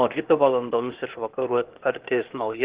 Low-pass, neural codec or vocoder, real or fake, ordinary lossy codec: 3.6 kHz; codec, 16 kHz, 0.8 kbps, ZipCodec; fake; Opus, 16 kbps